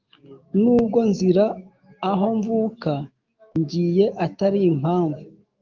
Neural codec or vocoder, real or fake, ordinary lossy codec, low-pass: autoencoder, 48 kHz, 128 numbers a frame, DAC-VAE, trained on Japanese speech; fake; Opus, 32 kbps; 7.2 kHz